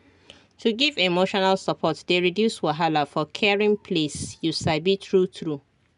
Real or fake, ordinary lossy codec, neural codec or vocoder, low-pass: real; none; none; 10.8 kHz